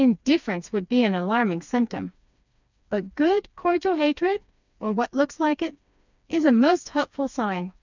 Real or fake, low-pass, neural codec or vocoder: fake; 7.2 kHz; codec, 16 kHz, 2 kbps, FreqCodec, smaller model